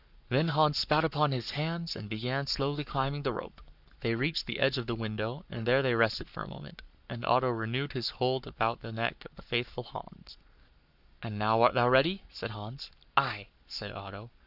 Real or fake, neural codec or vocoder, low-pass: fake; codec, 44.1 kHz, 7.8 kbps, Pupu-Codec; 5.4 kHz